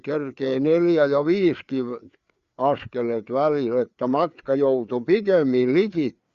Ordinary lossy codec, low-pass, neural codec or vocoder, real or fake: Opus, 64 kbps; 7.2 kHz; codec, 16 kHz, 4 kbps, FreqCodec, larger model; fake